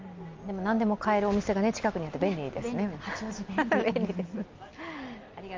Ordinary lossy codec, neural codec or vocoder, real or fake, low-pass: Opus, 24 kbps; none; real; 7.2 kHz